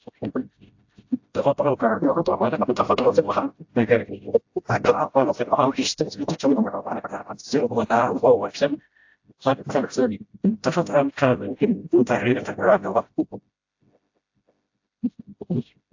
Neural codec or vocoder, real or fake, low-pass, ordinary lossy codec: codec, 16 kHz, 0.5 kbps, FreqCodec, smaller model; fake; 7.2 kHz; AAC, 48 kbps